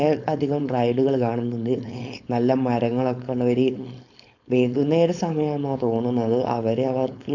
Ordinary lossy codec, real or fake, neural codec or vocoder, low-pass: none; fake; codec, 16 kHz, 4.8 kbps, FACodec; 7.2 kHz